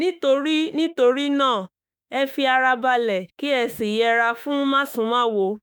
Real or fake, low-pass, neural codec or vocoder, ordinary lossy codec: fake; none; autoencoder, 48 kHz, 32 numbers a frame, DAC-VAE, trained on Japanese speech; none